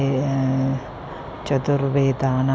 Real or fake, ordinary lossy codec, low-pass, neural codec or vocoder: real; none; none; none